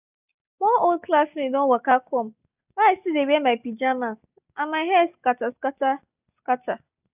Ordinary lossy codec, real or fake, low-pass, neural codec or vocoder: none; real; 3.6 kHz; none